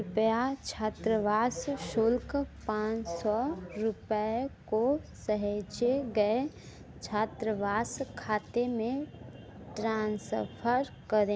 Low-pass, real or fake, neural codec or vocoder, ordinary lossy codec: none; real; none; none